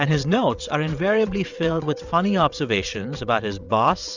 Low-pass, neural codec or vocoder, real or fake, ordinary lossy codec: 7.2 kHz; vocoder, 22.05 kHz, 80 mel bands, WaveNeXt; fake; Opus, 64 kbps